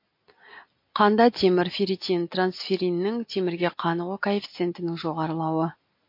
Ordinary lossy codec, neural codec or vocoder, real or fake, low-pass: MP3, 32 kbps; none; real; 5.4 kHz